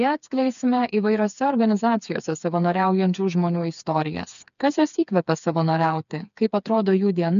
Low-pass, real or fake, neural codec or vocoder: 7.2 kHz; fake; codec, 16 kHz, 4 kbps, FreqCodec, smaller model